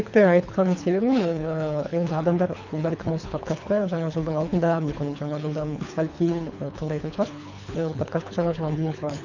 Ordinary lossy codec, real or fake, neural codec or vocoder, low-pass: none; fake; codec, 24 kHz, 3 kbps, HILCodec; 7.2 kHz